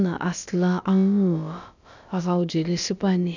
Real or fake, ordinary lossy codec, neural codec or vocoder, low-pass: fake; none; codec, 16 kHz, about 1 kbps, DyCAST, with the encoder's durations; 7.2 kHz